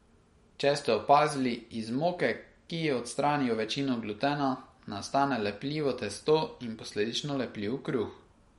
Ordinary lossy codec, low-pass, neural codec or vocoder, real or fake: MP3, 48 kbps; 19.8 kHz; none; real